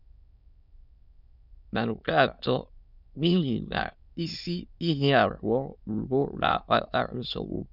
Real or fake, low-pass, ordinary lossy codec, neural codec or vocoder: fake; 5.4 kHz; none; autoencoder, 22.05 kHz, a latent of 192 numbers a frame, VITS, trained on many speakers